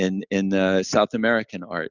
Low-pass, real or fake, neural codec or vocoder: 7.2 kHz; real; none